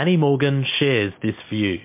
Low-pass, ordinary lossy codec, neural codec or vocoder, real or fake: 3.6 kHz; MP3, 24 kbps; none; real